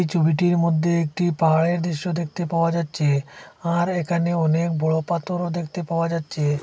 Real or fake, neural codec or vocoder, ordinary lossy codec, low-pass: real; none; none; none